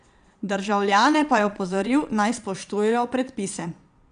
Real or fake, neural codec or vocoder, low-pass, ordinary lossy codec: fake; vocoder, 22.05 kHz, 80 mel bands, Vocos; 9.9 kHz; none